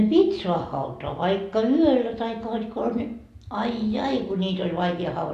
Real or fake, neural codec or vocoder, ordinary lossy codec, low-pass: real; none; AAC, 64 kbps; 14.4 kHz